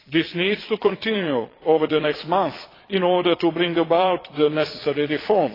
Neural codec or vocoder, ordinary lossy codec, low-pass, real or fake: vocoder, 22.05 kHz, 80 mel bands, Vocos; AAC, 24 kbps; 5.4 kHz; fake